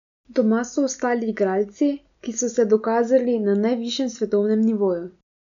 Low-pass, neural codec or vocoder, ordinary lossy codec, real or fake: 7.2 kHz; none; none; real